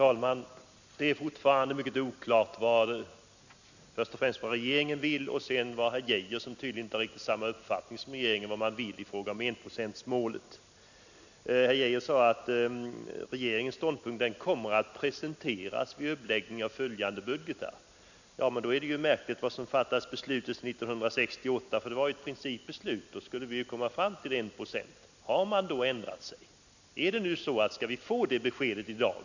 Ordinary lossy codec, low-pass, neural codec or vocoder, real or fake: none; 7.2 kHz; none; real